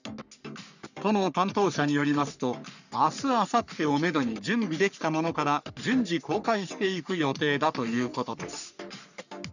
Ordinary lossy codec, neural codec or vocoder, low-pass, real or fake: none; codec, 44.1 kHz, 3.4 kbps, Pupu-Codec; 7.2 kHz; fake